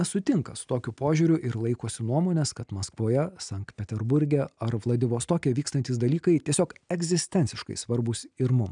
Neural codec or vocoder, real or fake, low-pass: none; real; 9.9 kHz